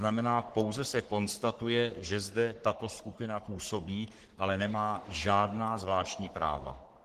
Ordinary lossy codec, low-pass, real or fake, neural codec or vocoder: Opus, 16 kbps; 14.4 kHz; fake; codec, 44.1 kHz, 3.4 kbps, Pupu-Codec